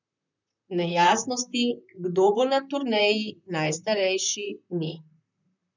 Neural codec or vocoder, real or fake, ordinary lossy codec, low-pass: vocoder, 44.1 kHz, 128 mel bands, Pupu-Vocoder; fake; none; 7.2 kHz